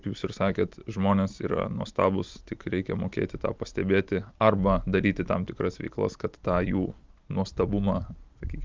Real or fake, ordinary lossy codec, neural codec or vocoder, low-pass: real; Opus, 32 kbps; none; 7.2 kHz